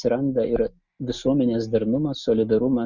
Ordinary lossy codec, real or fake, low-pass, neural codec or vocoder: Opus, 64 kbps; real; 7.2 kHz; none